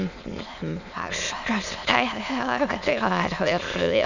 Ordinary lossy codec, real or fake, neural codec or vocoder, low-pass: none; fake; autoencoder, 22.05 kHz, a latent of 192 numbers a frame, VITS, trained on many speakers; 7.2 kHz